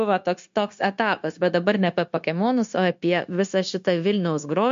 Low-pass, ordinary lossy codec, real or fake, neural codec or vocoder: 7.2 kHz; MP3, 48 kbps; fake; codec, 16 kHz, 0.9 kbps, LongCat-Audio-Codec